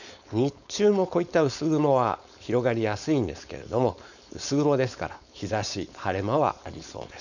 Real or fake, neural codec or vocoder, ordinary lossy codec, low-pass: fake; codec, 16 kHz, 4.8 kbps, FACodec; none; 7.2 kHz